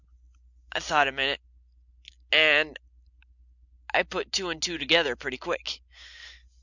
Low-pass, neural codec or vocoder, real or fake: 7.2 kHz; none; real